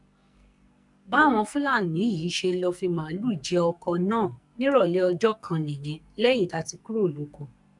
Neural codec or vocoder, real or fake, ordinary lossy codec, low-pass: codec, 44.1 kHz, 2.6 kbps, SNAC; fake; none; 10.8 kHz